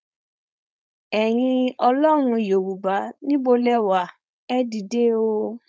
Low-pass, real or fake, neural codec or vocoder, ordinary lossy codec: none; fake; codec, 16 kHz, 4.8 kbps, FACodec; none